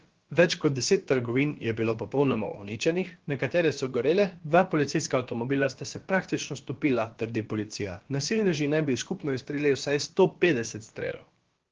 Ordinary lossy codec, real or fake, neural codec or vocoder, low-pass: Opus, 16 kbps; fake; codec, 16 kHz, about 1 kbps, DyCAST, with the encoder's durations; 7.2 kHz